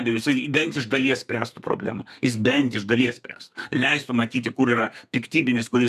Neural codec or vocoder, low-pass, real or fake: codec, 32 kHz, 1.9 kbps, SNAC; 14.4 kHz; fake